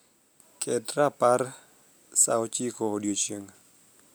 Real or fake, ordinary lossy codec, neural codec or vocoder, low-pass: real; none; none; none